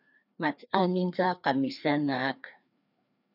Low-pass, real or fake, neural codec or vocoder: 5.4 kHz; fake; codec, 16 kHz, 2 kbps, FreqCodec, larger model